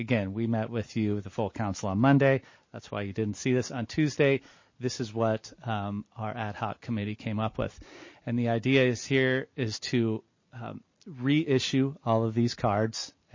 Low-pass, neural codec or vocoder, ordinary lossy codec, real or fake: 7.2 kHz; none; MP3, 32 kbps; real